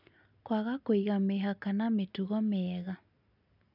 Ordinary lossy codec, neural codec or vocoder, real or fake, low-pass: none; none; real; 5.4 kHz